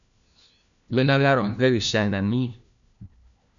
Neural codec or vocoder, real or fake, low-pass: codec, 16 kHz, 1 kbps, FunCodec, trained on LibriTTS, 50 frames a second; fake; 7.2 kHz